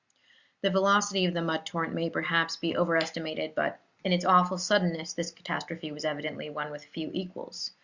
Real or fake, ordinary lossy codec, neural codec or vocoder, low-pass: real; Opus, 64 kbps; none; 7.2 kHz